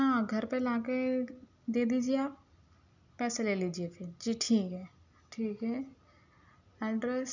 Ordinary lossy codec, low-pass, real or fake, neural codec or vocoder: none; 7.2 kHz; real; none